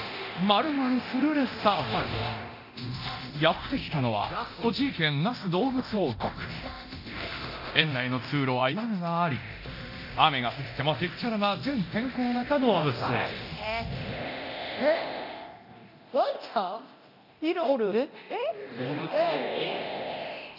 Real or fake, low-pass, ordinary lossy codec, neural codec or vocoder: fake; 5.4 kHz; none; codec, 24 kHz, 0.9 kbps, DualCodec